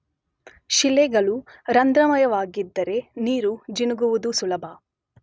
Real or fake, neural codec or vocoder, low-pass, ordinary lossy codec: real; none; none; none